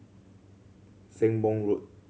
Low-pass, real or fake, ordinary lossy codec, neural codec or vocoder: none; real; none; none